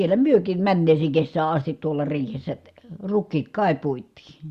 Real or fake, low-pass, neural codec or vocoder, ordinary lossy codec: real; 14.4 kHz; none; Opus, 64 kbps